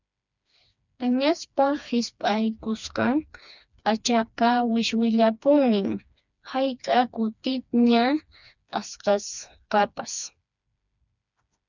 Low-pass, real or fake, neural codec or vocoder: 7.2 kHz; fake; codec, 16 kHz, 2 kbps, FreqCodec, smaller model